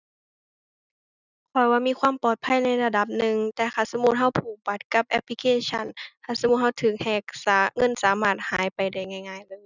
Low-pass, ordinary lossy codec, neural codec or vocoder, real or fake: 7.2 kHz; none; none; real